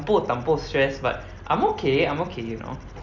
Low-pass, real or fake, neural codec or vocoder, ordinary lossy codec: 7.2 kHz; real; none; none